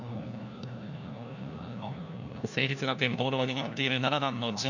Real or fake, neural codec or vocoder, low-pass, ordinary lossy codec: fake; codec, 16 kHz, 1 kbps, FunCodec, trained on LibriTTS, 50 frames a second; 7.2 kHz; none